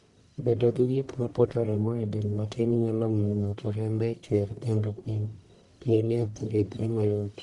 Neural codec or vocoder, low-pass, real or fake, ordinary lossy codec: codec, 44.1 kHz, 1.7 kbps, Pupu-Codec; 10.8 kHz; fake; none